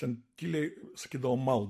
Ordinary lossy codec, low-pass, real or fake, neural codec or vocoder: MP3, 64 kbps; 14.4 kHz; real; none